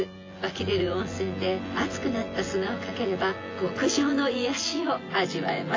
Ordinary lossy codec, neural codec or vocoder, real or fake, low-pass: AAC, 32 kbps; vocoder, 24 kHz, 100 mel bands, Vocos; fake; 7.2 kHz